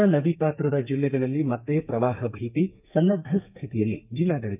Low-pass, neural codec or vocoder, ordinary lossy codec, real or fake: 3.6 kHz; codec, 32 kHz, 1.9 kbps, SNAC; none; fake